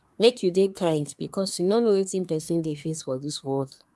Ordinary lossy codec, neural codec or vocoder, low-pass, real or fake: none; codec, 24 kHz, 1 kbps, SNAC; none; fake